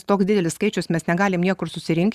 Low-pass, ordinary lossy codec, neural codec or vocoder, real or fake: 14.4 kHz; Opus, 64 kbps; none; real